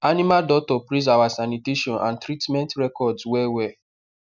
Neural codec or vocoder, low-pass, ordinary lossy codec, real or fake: none; 7.2 kHz; none; real